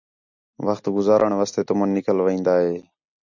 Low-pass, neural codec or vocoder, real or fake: 7.2 kHz; none; real